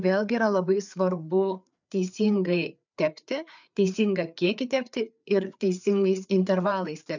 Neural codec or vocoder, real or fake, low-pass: codec, 16 kHz, 4 kbps, FreqCodec, larger model; fake; 7.2 kHz